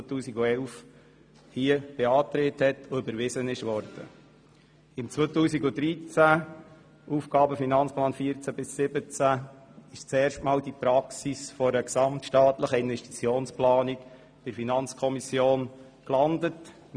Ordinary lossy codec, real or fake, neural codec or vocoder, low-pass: none; real; none; 9.9 kHz